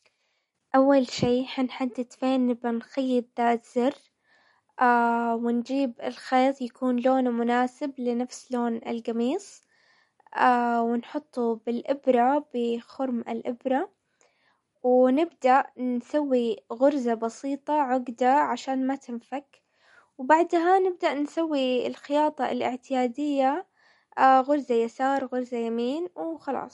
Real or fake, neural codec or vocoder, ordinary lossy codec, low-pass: real; none; MP3, 48 kbps; 19.8 kHz